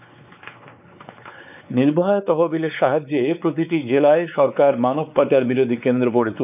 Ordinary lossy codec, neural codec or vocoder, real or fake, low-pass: none; codec, 16 kHz, 4 kbps, X-Codec, WavLM features, trained on Multilingual LibriSpeech; fake; 3.6 kHz